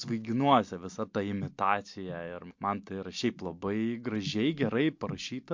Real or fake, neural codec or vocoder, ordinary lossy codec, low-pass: real; none; MP3, 48 kbps; 7.2 kHz